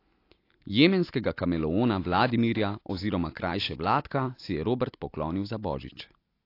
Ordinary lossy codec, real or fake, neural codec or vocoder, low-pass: AAC, 32 kbps; real; none; 5.4 kHz